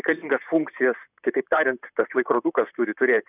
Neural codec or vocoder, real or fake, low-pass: vocoder, 44.1 kHz, 128 mel bands, Pupu-Vocoder; fake; 3.6 kHz